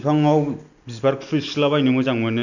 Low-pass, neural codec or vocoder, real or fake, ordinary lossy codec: 7.2 kHz; none; real; none